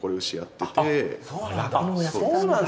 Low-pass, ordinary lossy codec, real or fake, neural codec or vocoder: none; none; real; none